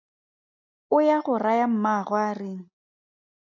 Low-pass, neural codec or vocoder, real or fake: 7.2 kHz; none; real